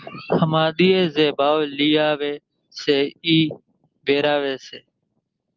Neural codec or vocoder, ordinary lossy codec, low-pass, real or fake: none; Opus, 32 kbps; 7.2 kHz; real